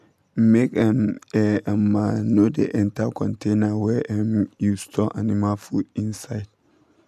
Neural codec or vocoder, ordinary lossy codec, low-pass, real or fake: none; none; 14.4 kHz; real